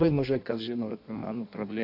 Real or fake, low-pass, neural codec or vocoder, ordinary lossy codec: fake; 5.4 kHz; codec, 16 kHz in and 24 kHz out, 1.1 kbps, FireRedTTS-2 codec; AAC, 32 kbps